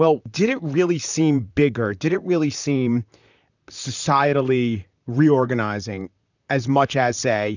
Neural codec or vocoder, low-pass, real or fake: vocoder, 44.1 kHz, 128 mel bands, Pupu-Vocoder; 7.2 kHz; fake